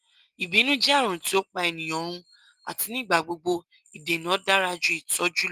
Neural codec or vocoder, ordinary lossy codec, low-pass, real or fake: none; Opus, 24 kbps; 14.4 kHz; real